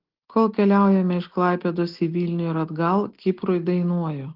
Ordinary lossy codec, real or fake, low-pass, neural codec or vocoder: Opus, 16 kbps; real; 5.4 kHz; none